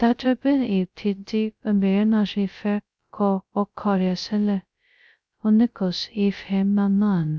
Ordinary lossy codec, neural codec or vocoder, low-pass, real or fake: none; codec, 16 kHz, 0.2 kbps, FocalCodec; none; fake